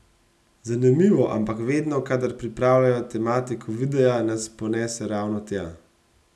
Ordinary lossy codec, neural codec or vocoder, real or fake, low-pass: none; none; real; none